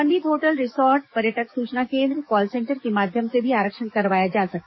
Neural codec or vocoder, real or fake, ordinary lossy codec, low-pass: codec, 44.1 kHz, 7.8 kbps, DAC; fake; MP3, 24 kbps; 7.2 kHz